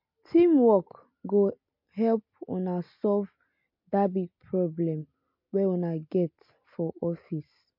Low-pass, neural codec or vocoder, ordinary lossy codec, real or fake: 5.4 kHz; none; MP3, 32 kbps; real